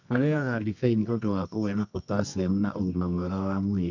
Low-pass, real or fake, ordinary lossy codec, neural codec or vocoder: 7.2 kHz; fake; none; codec, 24 kHz, 0.9 kbps, WavTokenizer, medium music audio release